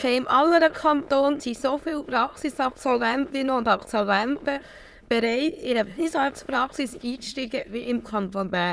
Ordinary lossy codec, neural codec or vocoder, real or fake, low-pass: none; autoencoder, 22.05 kHz, a latent of 192 numbers a frame, VITS, trained on many speakers; fake; none